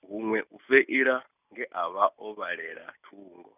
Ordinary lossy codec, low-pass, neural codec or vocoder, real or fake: none; 3.6 kHz; none; real